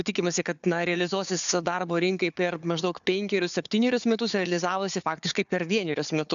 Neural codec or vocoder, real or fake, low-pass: codec, 16 kHz, 4 kbps, FunCodec, trained on Chinese and English, 50 frames a second; fake; 7.2 kHz